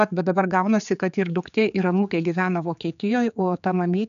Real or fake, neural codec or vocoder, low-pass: fake; codec, 16 kHz, 4 kbps, X-Codec, HuBERT features, trained on general audio; 7.2 kHz